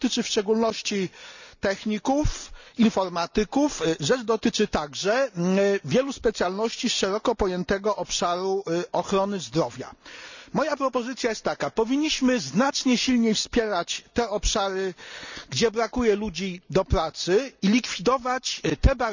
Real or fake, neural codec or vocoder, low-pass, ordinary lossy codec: real; none; 7.2 kHz; none